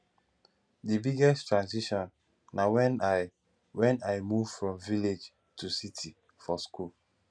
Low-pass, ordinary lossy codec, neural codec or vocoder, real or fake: 9.9 kHz; none; none; real